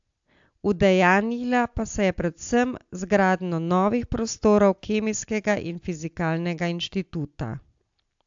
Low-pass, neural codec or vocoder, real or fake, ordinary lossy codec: 7.2 kHz; none; real; AAC, 64 kbps